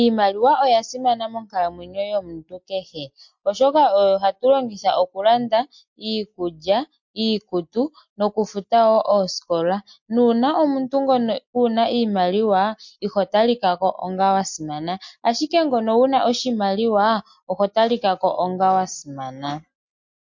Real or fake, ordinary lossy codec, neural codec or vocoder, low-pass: real; MP3, 48 kbps; none; 7.2 kHz